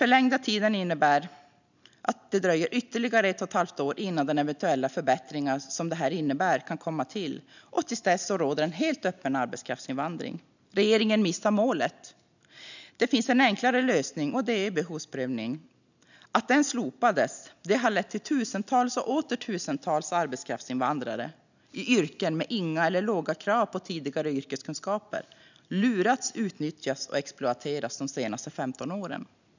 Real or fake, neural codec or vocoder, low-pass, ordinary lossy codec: real; none; 7.2 kHz; none